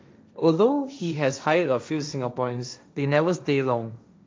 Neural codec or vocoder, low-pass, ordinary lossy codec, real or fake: codec, 16 kHz, 1.1 kbps, Voila-Tokenizer; none; none; fake